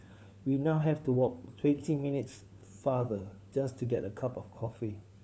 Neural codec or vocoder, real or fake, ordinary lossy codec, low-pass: codec, 16 kHz, 4 kbps, FunCodec, trained on LibriTTS, 50 frames a second; fake; none; none